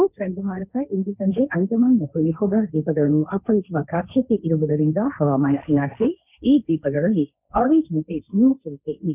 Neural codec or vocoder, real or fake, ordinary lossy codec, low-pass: codec, 16 kHz, 1.1 kbps, Voila-Tokenizer; fake; none; 3.6 kHz